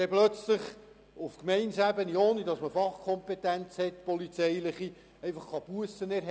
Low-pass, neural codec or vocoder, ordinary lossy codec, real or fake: none; none; none; real